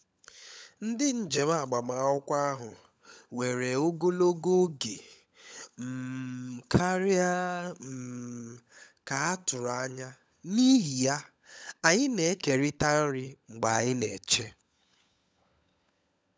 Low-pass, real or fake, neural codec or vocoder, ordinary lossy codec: none; fake; codec, 16 kHz, 16 kbps, FunCodec, trained on LibriTTS, 50 frames a second; none